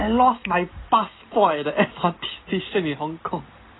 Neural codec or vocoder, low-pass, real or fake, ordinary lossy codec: none; 7.2 kHz; real; AAC, 16 kbps